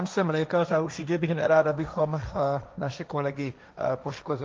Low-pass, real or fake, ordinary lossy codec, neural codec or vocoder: 7.2 kHz; fake; Opus, 24 kbps; codec, 16 kHz, 1.1 kbps, Voila-Tokenizer